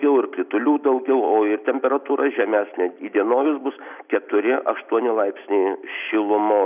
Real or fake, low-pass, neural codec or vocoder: real; 3.6 kHz; none